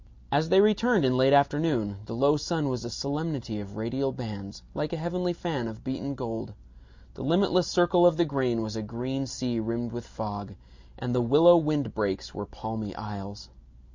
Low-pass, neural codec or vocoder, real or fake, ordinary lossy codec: 7.2 kHz; none; real; MP3, 64 kbps